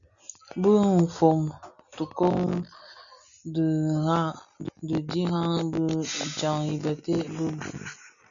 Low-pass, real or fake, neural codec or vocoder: 7.2 kHz; real; none